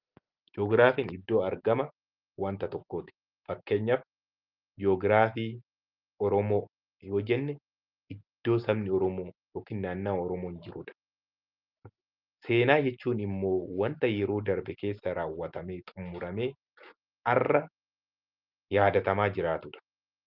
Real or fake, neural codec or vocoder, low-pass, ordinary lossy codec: real; none; 5.4 kHz; Opus, 24 kbps